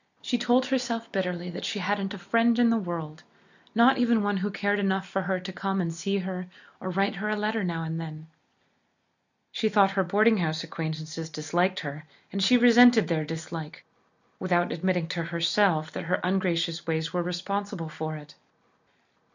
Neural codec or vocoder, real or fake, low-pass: none; real; 7.2 kHz